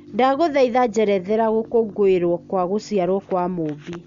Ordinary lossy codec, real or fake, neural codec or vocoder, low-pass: MP3, 64 kbps; real; none; 7.2 kHz